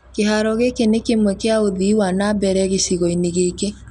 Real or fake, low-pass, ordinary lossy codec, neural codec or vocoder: real; 10.8 kHz; none; none